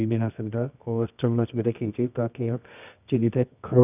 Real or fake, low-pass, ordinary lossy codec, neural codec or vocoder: fake; 3.6 kHz; none; codec, 24 kHz, 0.9 kbps, WavTokenizer, medium music audio release